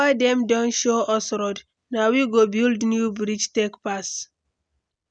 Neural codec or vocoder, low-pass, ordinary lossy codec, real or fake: none; 9.9 kHz; none; real